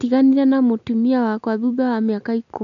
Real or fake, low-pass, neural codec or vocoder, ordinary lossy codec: real; 7.2 kHz; none; none